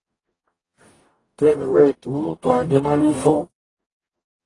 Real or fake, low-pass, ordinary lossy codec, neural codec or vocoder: fake; 10.8 kHz; AAC, 32 kbps; codec, 44.1 kHz, 0.9 kbps, DAC